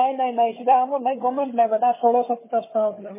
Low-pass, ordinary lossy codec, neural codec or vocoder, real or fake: 3.6 kHz; MP3, 16 kbps; codec, 16 kHz, 4 kbps, FunCodec, trained on Chinese and English, 50 frames a second; fake